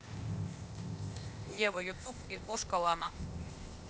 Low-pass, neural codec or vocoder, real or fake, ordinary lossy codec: none; codec, 16 kHz, 0.8 kbps, ZipCodec; fake; none